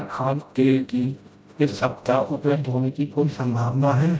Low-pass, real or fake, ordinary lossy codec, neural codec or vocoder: none; fake; none; codec, 16 kHz, 0.5 kbps, FreqCodec, smaller model